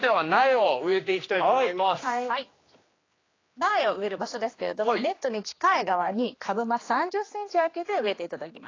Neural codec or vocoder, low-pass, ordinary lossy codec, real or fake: codec, 16 kHz, 2 kbps, X-Codec, HuBERT features, trained on general audio; 7.2 kHz; AAC, 32 kbps; fake